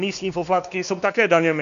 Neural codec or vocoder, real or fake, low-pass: codec, 16 kHz, 2 kbps, X-Codec, WavLM features, trained on Multilingual LibriSpeech; fake; 7.2 kHz